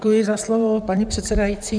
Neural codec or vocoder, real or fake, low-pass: vocoder, 44.1 kHz, 128 mel bands, Pupu-Vocoder; fake; 9.9 kHz